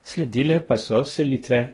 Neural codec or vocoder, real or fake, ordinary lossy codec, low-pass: codec, 16 kHz in and 24 kHz out, 0.8 kbps, FocalCodec, streaming, 65536 codes; fake; AAC, 32 kbps; 10.8 kHz